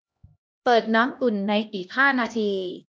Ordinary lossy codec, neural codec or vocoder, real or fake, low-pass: none; codec, 16 kHz, 1 kbps, X-Codec, HuBERT features, trained on LibriSpeech; fake; none